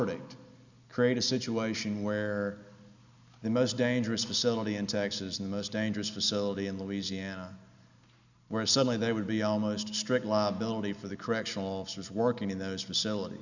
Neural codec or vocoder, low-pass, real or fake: none; 7.2 kHz; real